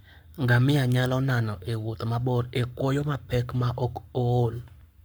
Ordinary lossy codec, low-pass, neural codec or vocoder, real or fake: none; none; codec, 44.1 kHz, 7.8 kbps, Pupu-Codec; fake